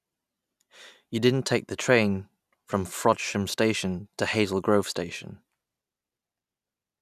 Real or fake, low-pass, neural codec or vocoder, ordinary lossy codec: real; 14.4 kHz; none; none